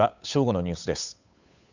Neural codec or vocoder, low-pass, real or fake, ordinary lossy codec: codec, 16 kHz, 8 kbps, FunCodec, trained on Chinese and English, 25 frames a second; 7.2 kHz; fake; none